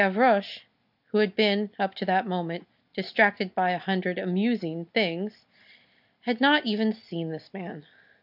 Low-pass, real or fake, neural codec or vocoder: 5.4 kHz; real; none